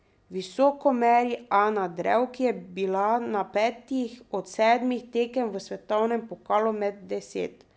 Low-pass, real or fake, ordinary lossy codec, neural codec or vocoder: none; real; none; none